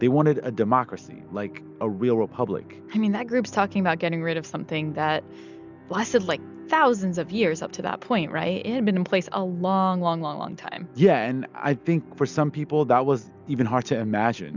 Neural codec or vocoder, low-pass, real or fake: none; 7.2 kHz; real